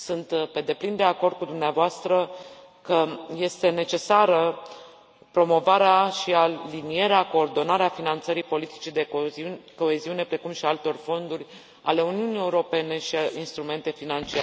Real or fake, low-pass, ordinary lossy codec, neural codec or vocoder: real; none; none; none